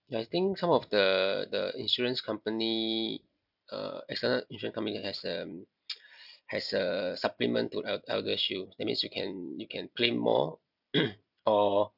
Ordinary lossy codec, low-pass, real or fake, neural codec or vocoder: none; 5.4 kHz; real; none